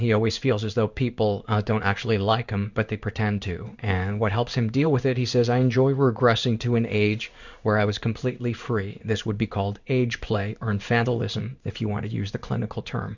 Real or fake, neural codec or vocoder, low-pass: fake; codec, 16 kHz in and 24 kHz out, 1 kbps, XY-Tokenizer; 7.2 kHz